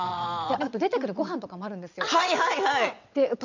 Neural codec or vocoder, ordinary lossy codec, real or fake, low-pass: vocoder, 22.05 kHz, 80 mel bands, WaveNeXt; none; fake; 7.2 kHz